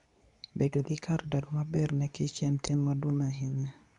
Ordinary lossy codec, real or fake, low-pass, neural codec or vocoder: none; fake; 10.8 kHz; codec, 24 kHz, 0.9 kbps, WavTokenizer, medium speech release version 2